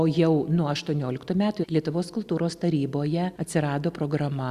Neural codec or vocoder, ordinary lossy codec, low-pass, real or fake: none; Opus, 64 kbps; 14.4 kHz; real